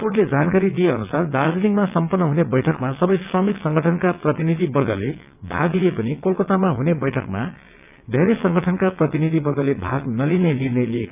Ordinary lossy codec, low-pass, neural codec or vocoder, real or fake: none; 3.6 kHz; vocoder, 22.05 kHz, 80 mel bands, WaveNeXt; fake